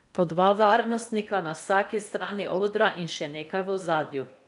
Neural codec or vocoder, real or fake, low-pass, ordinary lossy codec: codec, 16 kHz in and 24 kHz out, 0.8 kbps, FocalCodec, streaming, 65536 codes; fake; 10.8 kHz; none